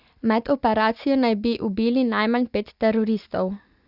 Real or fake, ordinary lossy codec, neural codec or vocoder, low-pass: real; Opus, 64 kbps; none; 5.4 kHz